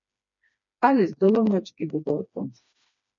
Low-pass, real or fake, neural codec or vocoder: 7.2 kHz; fake; codec, 16 kHz, 2 kbps, FreqCodec, smaller model